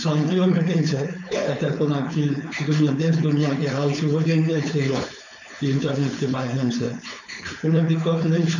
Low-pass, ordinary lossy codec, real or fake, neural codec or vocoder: 7.2 kHz; none; fake; codec, 16 kHz, 4.8 kbps, FACodec